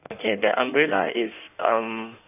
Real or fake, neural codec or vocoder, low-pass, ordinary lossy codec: fake; codec, 16 kHz in and 24 kHz out, 1.1 kbps, FireRedTTS-2 codec; 3.6 kHz; none